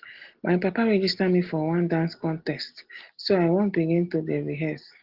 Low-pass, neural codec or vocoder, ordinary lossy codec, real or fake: 5.4 kHz; none; Opus, 16 kbps; real